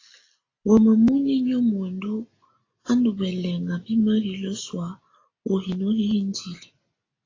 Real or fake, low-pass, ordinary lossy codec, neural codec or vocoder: real; 7.2 kHz; AAC, 32 kbps; none